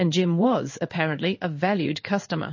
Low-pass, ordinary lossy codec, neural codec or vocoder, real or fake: 7.2 kHz; MP3, 32 kbps; codec, 16 kHz in and 24 kHz out, 1 kbps, XY-Tokenizer; fake